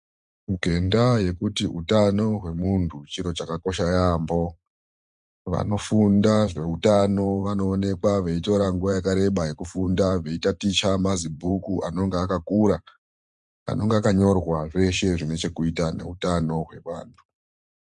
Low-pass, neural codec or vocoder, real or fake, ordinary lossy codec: 10.8 kHz; vocoder, 44.1 kHz, 128 mel bands every 512 samples, BigVGAN v2; fake; MP3, 64 kbps